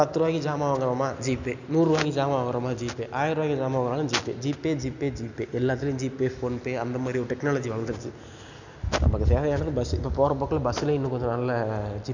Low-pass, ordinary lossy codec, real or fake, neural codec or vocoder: 7.2 kHz; none; real; none